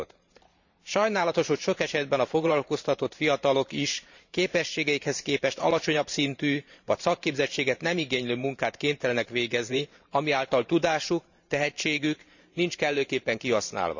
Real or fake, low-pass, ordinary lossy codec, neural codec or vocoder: fake; 7.2 kHz; none; vocoder, 44.1 kHz, 128 mel bands every 512 samples, BigVGAN v2